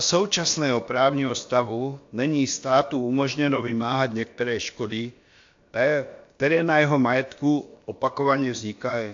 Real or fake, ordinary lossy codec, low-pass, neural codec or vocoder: fake; AAC, 48 kbps; 7.2 kHz; codec, 16 kHz, about 1 kbps, DyCAST, with the encoder's durations